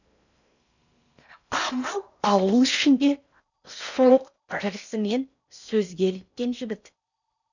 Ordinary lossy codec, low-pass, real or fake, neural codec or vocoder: none; 7.2 kHz; fake; codec, 16 kHz in and 24 kHz out, 0.6 kbps, FocalCodec, streaming, 4096 codes